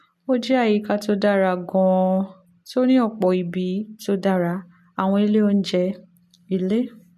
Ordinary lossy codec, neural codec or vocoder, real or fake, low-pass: MP3, 64 kbps; none; real; 14.4 kHz